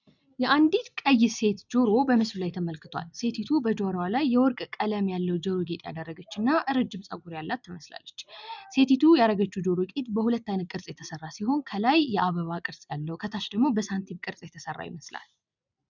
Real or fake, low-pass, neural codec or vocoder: real; 7.2 kHz; none